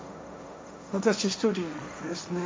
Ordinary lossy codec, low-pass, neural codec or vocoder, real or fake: none; none; codec, 16 kHz, 1.1 kbps, Voila-Tokenizer; fake